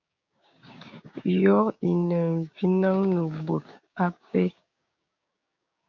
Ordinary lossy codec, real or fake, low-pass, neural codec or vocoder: MP3, 64 kbps; fake; 7.2 kHz; codec, 16 kHz, 6 kbps, DAC